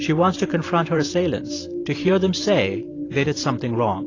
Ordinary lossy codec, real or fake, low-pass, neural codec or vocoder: AAC, 32 kbps; fake; 7.2 kHz; vocoder, 44.1 kHz, 128 mel bands every 512 samples, BigVGAN v2